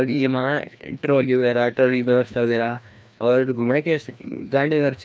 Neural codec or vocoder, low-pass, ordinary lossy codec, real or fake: codec, 16 kHz, 1 kbps, FreqCodec, larger model; none; none; fake